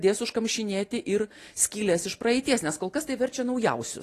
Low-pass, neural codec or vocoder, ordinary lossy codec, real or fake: 14.4 kHz; none; AAC, 48 kbps; real